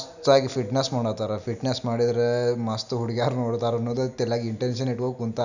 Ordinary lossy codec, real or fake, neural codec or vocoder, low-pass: none; real; none; 7.2 kHz